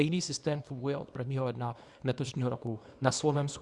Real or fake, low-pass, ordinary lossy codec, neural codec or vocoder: fake; 10.8 kHz; Opus, 64 kbps; codec, 24 kHz, 0.9 kbps, WavTokenizer, small release